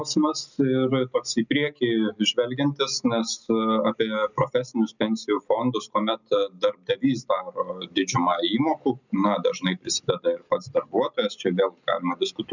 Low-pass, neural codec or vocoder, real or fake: 7.2 kHz; none; real